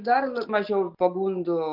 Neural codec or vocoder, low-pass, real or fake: none; 5.4 kHz; real